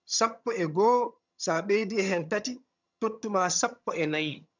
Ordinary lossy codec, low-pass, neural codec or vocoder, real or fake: none; 7.2 kHz; vocoder, 22.05 kHz, 80 mel bands, HiFi-GAN; fake